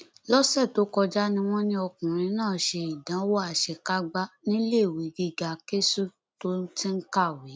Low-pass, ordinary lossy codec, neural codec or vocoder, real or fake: none; none; none; real